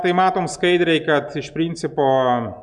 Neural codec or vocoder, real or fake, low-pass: none; real; 10.8 kHz